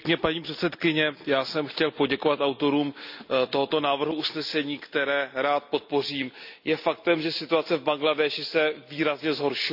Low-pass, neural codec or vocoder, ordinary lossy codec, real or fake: 5.4 kHz; none; none; real